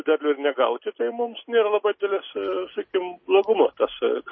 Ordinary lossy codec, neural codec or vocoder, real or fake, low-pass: MP3, 24 kbps; none; real; 7.2 kHz